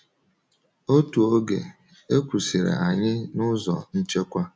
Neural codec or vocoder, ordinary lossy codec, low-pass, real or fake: none; none; none; real